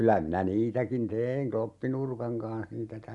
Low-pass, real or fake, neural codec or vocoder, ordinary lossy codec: 10.8 kHz; real; none; MP3, 96 kbps